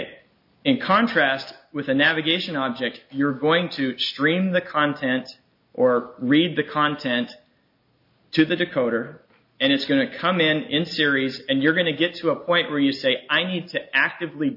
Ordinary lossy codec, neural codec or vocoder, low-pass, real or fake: MP3, 32 kbps; none; 5.4 kHz; real